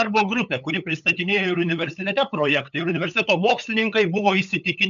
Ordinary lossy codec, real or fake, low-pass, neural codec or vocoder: MP3, 96 kbps; fake; 7.2 kHz; codec, 16 kHz, 8 kbps, FunCodec, trained on LibriTTS, 25 frames a second